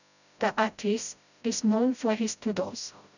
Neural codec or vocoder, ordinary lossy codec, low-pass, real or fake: codec, 16 kHz, 0.5 kbps, FreqCodec, smaller model; none; 7.2 kHz; fake